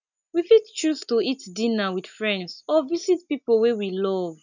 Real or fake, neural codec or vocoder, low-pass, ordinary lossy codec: real; none; 7.2 kHz; none